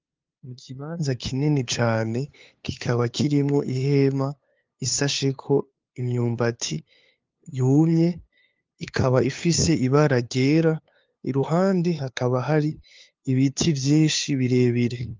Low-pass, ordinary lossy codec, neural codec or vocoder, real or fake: 7.2 kHz; Opus, 32 kbps; codec, 16 kHz, 2 kbps, FunCodec, trained on LibriTTS, 25 frames a second; fake